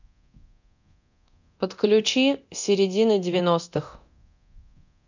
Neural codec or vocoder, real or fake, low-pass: codec, 24 kHz, 0.9 kbps, DualCodec; fake; 7.2 kHz